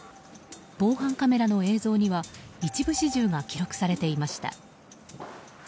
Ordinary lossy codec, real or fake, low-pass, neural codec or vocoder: none; real; none; none